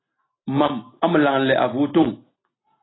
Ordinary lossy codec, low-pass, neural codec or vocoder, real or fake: AAC, 16 kbps; 7.2 kHz; none; real